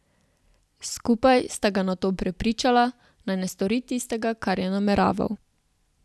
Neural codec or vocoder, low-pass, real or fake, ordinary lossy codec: none; none; real; none